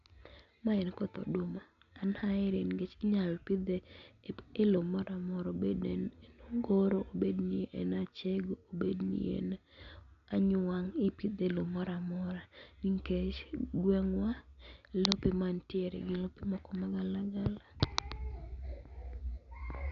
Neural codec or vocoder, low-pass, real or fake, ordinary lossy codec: none; 7.2 kHz; real; none